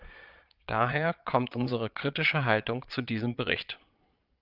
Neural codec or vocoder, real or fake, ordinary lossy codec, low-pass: vocoder, 22.05 kHz, 80 mel bands, Vocos; fake; Opus, 24 kbps; 5.4 kHz